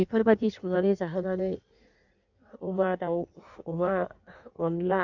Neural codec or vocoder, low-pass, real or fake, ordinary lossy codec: codec, 16 kHz in and 24 kHz out, 1.1 kbps, FireRedTTS-2 codec; 7.2 kHz; fake; none